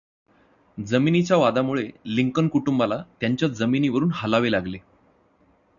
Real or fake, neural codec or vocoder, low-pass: real; none; 7.2 kHz